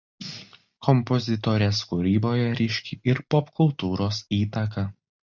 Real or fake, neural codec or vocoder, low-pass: real; none; 7.2 kHz